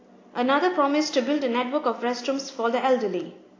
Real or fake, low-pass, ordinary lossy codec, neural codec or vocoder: real; 7.2 kHz; AAC, 32 kbps; none